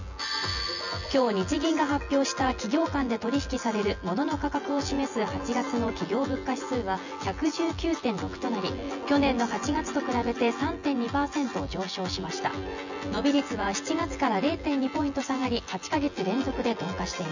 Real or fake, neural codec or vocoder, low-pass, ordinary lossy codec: fake; vocoder, 24 kHz, 100 mel bands, Vocos; 7.2 kHz; none